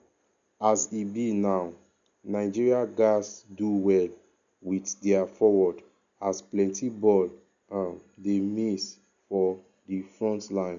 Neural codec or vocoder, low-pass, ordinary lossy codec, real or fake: none; 7.2 kHz; none; real